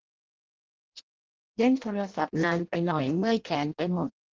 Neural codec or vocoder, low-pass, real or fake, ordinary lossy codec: codec, 16 kHz in and 24 kHz out, 0.6 kbps, FireRedTTS-2 codec; 7.2 kHz; fake; Opus, 16 kbps